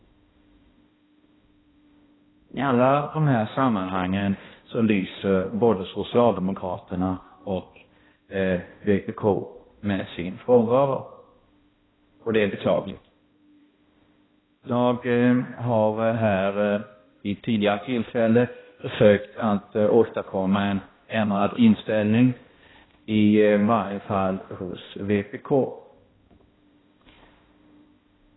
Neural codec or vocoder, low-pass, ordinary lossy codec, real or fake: codec, 16 kHz, 1 kbps, X-Codec, HuBERT features, trained on balanced general audio; 7.2 kHz; AAC, 16 kbps; fake